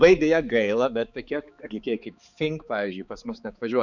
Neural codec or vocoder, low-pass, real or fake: codec, 16 kHz, 4 kbps, X-Codec, HuBERT features, trained on balanced general audio; 7.2 kHz; fake